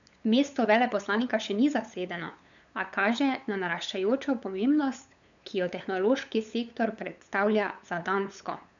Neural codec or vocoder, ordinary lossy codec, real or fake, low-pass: codec, 16 kHz, 8 kbps, FunCodec, trained on LibriTTS, 25 frames a second; none; fake; 7.2 kHz